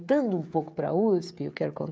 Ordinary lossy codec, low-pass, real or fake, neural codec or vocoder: none; none; fake; codec, 16 kHz, 16 kbps, FreqCodec, smaller model